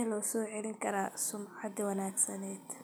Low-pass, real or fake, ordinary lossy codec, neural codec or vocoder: none; real; none; none